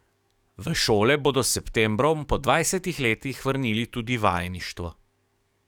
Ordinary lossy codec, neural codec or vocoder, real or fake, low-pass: none; codec, 44.1 kHz, 7.8 kbps, DAC; fake; 19.8 kHz